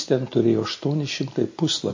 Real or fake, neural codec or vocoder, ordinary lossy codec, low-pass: real; none; MP3, 32 kbps; 7.2 kHz